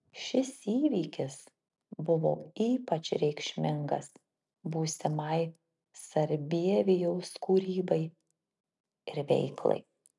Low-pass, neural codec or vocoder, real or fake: 10.8 kHz; none; real